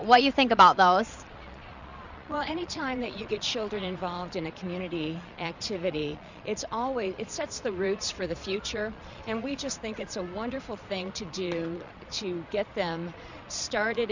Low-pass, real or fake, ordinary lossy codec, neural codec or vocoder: 7.2 kHz; fake; Opus, 64 kbps; vocoder, 22.05 kHz, 80 mel bands, Vocos